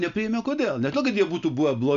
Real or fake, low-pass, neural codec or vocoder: real; 7.2 kHz; none